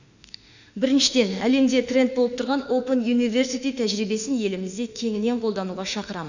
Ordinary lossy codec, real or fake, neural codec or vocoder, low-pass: none; fake; autoencoder, 48 kHz, 32 numbers a frame, DAC-VAE, trained on Japanese speech; 7.2 kHz